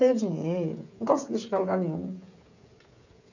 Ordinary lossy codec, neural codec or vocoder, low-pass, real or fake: none; codec, 16 kHz, 8 kbps, FreqCodec, smaller model; 7.2 kHz; fake